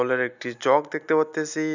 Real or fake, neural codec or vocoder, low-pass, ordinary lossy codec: real; none; 7.2 kHz; none